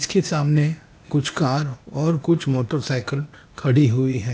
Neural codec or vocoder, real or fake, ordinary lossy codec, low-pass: codec, 16 kHz, 0.8 kbps, ZipCodec; fake; none; none